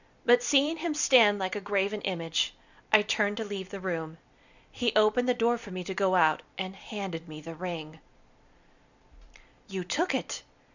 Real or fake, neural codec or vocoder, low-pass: fake; vocoder, 44.1 kHz, 128 mel bands every 256 samples, BigVGAN v2; 7.2 kHz